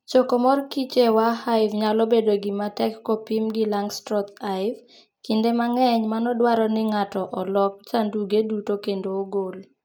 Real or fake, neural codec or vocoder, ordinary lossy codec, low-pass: real; none; none; none